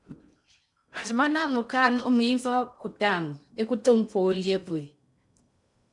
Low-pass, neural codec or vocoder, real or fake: 10.8 kHz; codec, 16 kHz in and 24 kHz out, 0.6 kbps, FocalCodec, streaming, 4096 codes; fake